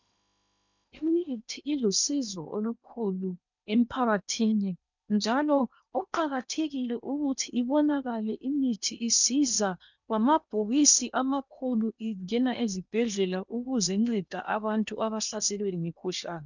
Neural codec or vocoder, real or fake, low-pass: codec, 16 kHz in and 24 kHz out, 0.8 kbps, FocalCodec, streaming, 65536 codes; fake; 7.2 kHz